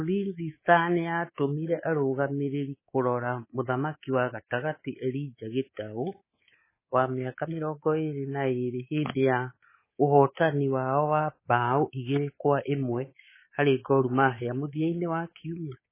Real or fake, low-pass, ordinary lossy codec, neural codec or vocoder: fake; 3.6 kHz; MP3, 16 kbps; codec, 24 kHz, 3.1 kbps, DualCodec